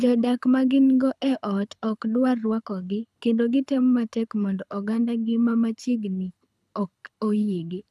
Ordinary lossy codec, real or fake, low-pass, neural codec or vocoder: none; fake; none; codec, 24 kHz, 6 kbps, HILCodec